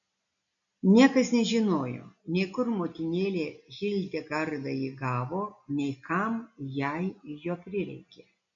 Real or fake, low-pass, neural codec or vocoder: real; 7.2 kHz; none